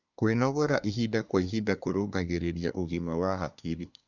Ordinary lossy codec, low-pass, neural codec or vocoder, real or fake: none; 7.2 kHz; codec, 24 kHz, 1 kbps, SNAC; fake